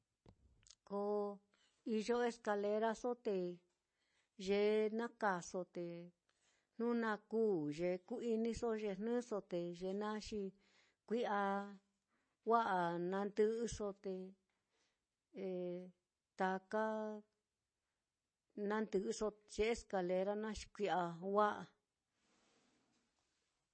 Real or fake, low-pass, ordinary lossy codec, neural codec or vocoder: real; 9.9 kHz; MP3, 32 kbps; none